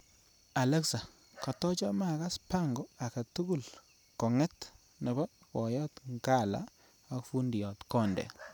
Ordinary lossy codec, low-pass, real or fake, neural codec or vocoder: none; none; real; none